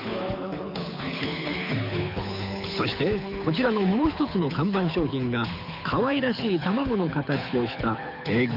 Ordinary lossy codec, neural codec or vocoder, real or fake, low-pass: none; codec, 24 kHz, 6 kbps, HILCodec; fake; 5.4 kHz